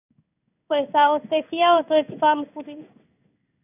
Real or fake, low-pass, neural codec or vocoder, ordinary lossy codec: fake; 3.6 kHz; codec, 16 kHz in and 24 kHz out, 1 kbps, XY-Tokenizer; none